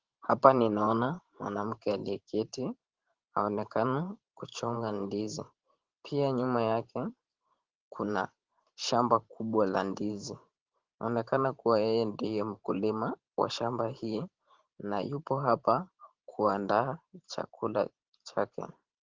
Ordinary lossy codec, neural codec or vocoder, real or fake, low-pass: Opus, 16 kbps; vocoder, 44.1 kHz, 128 mel bands every 512 samples, BigVGAN v2; fake; 7.2 kHz